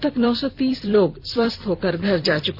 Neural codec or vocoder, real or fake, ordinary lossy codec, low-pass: vocoder, 44.1 kHz, 128 mel bands every 256 samples, BigVGAN v2; fake; none; 5.4 kHz